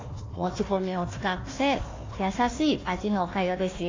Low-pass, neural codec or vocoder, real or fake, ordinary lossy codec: 7.2 kHz; codec, 16 kHz, 1 kbps, FunCodec, trained on Chinese and English, 50 frames a second; fake; AAC, 32 kbps